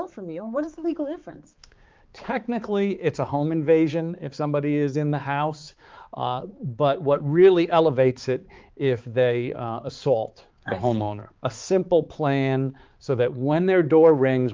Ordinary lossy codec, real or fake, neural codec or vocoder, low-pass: Opus, 32 kbps; fake; codec, 16 kHz, 4 kbps, X-Codec, HuBERT features, trained on balanced general audio; 7.2 kHz